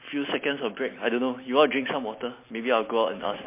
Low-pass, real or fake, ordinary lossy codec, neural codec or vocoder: 3.6 kHz; real; MP3, 24 kbps; none